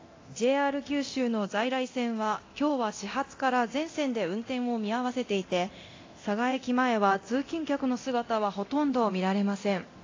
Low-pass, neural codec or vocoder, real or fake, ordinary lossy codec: 7.2 kHz; codec, 24 kHz, 0.9 kbps, DualCodec; fake; AAC, 32 kbps